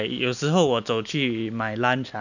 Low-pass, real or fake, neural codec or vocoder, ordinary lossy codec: 7.2 kHz; real; none; none